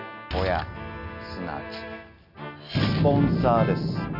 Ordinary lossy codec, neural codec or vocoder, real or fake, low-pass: none; none; real; 5.4 kHz